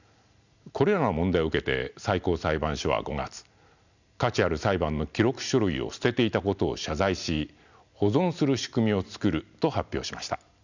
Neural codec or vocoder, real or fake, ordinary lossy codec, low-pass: none; real; none; 7.2 kHz